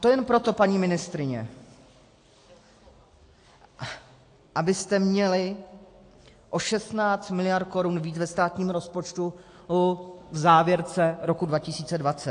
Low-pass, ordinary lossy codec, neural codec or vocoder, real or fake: 9.9 kHz; AAC, 48 kbps; none; real